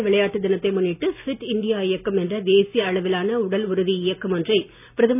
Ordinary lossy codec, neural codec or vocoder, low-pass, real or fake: none; none; 3.6 kHz; real